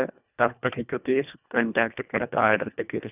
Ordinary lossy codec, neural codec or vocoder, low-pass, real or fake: none; codec, 24 kHz, 1.5 kbps, HILCodec; 3.6 kHz; fake